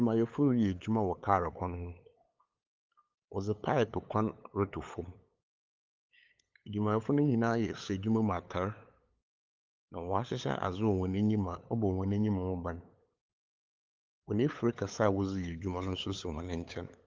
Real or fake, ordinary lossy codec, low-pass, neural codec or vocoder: fake; Opus, 32 kbps; 7.2 kHz; codec, 16 kHz, 8 kbps, FunCodec, trained on LibriTTS, 25 frames a second